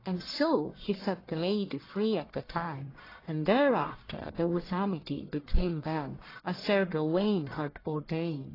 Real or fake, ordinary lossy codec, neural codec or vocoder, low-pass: fake; AAC, 24 kbps; codec, 44.1 kHz, 1.7 kbps, Pupu-Codec; 5.4 kHz